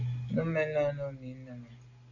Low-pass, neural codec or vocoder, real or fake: 7.2 kHz; none; real